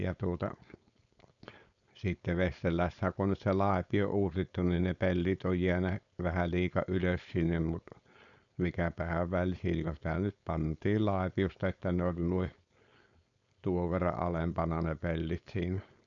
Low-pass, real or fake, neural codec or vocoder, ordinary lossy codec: 7.2 kHz; fake; codec, 16 kHz, 4.8 kbps, FACodec; none